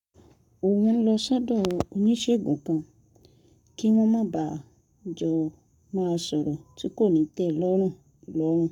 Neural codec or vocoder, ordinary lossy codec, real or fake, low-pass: codec, 44.1 kHz, 7.8 kbps, Pupu-Codec; none; fake; 19.8 kHz